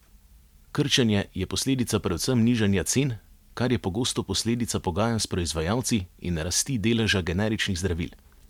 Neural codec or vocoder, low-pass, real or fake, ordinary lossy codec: none; 19.8 kHz; real; MP3, 96 kbps